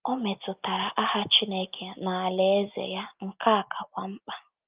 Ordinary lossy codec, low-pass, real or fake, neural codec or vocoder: Opus, 24 kbps; 3.6 kHz; real; none